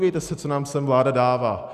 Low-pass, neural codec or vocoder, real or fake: 14.4 kHz; none; real